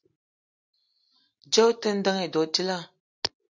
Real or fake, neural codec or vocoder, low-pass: real; none; 7.2 kHz